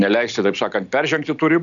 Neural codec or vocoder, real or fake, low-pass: none; real; 7.2 kHz